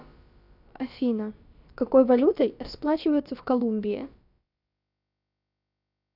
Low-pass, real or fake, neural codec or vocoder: 5.4 kHz; fake; codec, 16 kHz, about 1 kbps, DyCAST, with the encoder's durations